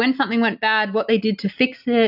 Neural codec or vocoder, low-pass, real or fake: none; 5.4 kHz; real